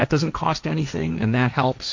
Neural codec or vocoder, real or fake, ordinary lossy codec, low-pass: codec, 16 kHz, 1.1 kbps, Voila-Tokenizer; fake; MP3, 64 kbps; 7.2 kHz